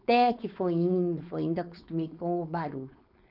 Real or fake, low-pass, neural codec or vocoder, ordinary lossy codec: fake; 5.4 kHz; codec, 16 kHz, 4.8 kbps, FACodec; none